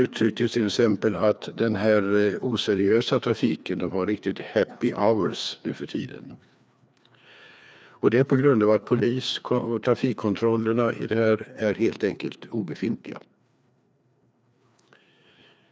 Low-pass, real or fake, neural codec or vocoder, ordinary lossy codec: none; fake; codec, 16 kHz, 2 kbps, FreqCodec, larger model; none